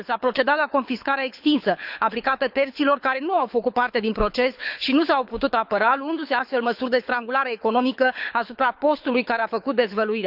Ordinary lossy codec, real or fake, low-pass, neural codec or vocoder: none; fake; 5.4 kHz; codec, 24 kHz, 6 kbps, HILCodec